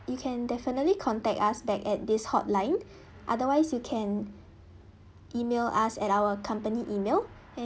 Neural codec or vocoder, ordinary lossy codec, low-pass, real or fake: none; none; none; real